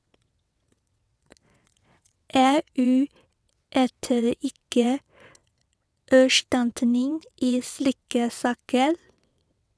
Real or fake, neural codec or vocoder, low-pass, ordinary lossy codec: fake; vocoder, 22.05 kHz, 80 mel bands, WaveNeXt; none; none